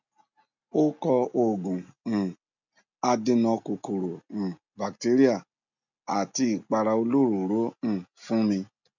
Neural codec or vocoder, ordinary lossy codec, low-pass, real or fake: none; none; 7.2 kHz; real